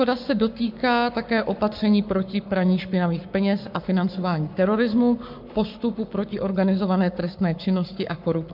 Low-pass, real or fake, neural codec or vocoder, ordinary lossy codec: 5.4 kHz; fake; codec, 44.1 kHz, 7.8 kbps, Pupu-Codec; MP3, 48 kbps